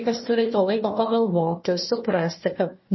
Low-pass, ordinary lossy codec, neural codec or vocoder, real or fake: 7.2 kHz; MP3, 24 kbps; codec, 16 kHz, 1 kbps, FunCodec, trained on Chinese and English, 50 frames a second; fake